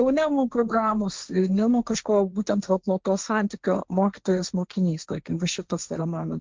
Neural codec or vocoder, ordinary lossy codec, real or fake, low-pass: codec, 16 kHz, 1.1 kbps, Voila-Tokenizer; Opus, 24 kbps; fake; 7.2 kHz